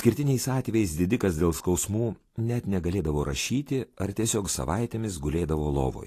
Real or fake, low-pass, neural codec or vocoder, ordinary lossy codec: real; 14.4 kHz; none; AAC, 48 kbps